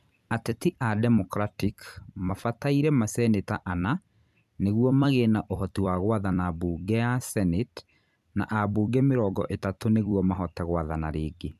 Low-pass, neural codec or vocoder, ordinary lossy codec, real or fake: 14.4 kHz; vocoder, 44.1 kHz, 128 mel bands every 256 samples, BigVGAN v2; none; fake